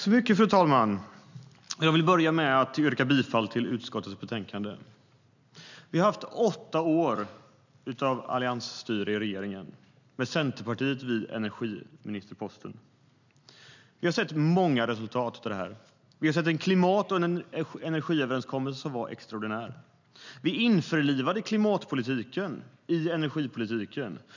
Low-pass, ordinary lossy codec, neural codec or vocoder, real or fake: 7.2 kHz; none; none; real